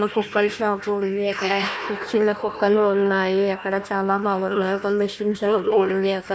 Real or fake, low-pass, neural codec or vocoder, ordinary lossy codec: fake; none; codec, 16 kHz, 1 kbps, FunCodec, trained on Chinese and English, 50 frames a second; none